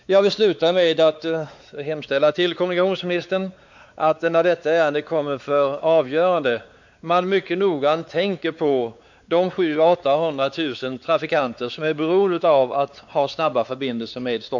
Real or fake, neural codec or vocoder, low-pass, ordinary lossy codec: fake; codec, 16 kHz, 4 kbps, X-Codec, WavLM features, trained on Multilingual LibriSpeech; 7.2 kHz; MP3, 64 kbps